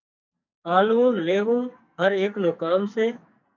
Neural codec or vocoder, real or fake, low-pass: codec, 44.1 kHz, 2.6 kbps, SNAC; fake; 7.2 kHz